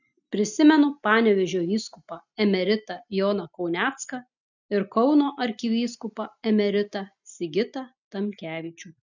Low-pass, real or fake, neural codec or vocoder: 7.2 kHz; real; none